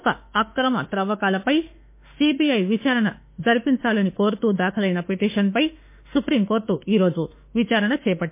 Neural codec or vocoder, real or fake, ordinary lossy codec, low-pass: autoencoder, 48 kHz, 32 numbers a frame, DAC-VAE, trained on Japanese speech; fake; MP3, 24 kbps; 3.6 kHz